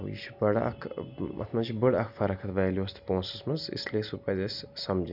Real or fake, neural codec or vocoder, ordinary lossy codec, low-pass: real; none; none; 5.4 kHz